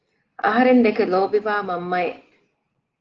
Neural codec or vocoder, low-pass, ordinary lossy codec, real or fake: none; 7.2 kHz; Opus, 16 kbps; real